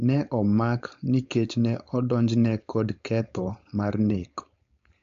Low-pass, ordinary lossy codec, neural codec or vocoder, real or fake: 7.2 kHz; AAC, 64 kbps; codec, 16 kHz, 4.8 kbps, FACodec; fake